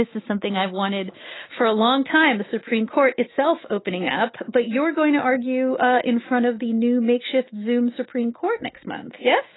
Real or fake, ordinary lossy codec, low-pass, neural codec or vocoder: fake; AAC, 16 kbps; 7.2 kHz; autoencoder, 48 kHz, 128 numbers a frame, DAC-VAE, trained on Japanese speech